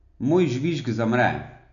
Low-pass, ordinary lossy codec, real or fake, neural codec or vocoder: 7.2 kHz; none; real; none